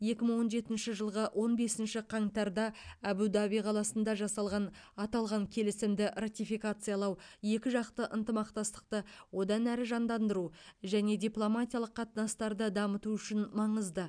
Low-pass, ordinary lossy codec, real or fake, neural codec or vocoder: 9.9 kHz; none; real; none